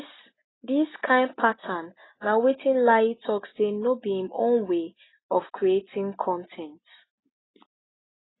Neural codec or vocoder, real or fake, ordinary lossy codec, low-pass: none; real; AAC, 16 kbps; 7.2 kHz